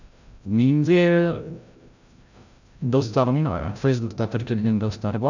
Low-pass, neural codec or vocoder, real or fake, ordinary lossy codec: 7.2 kHz; codec, 16 kHz, 0.5 kbps, FreqCodec, larger model; fake; none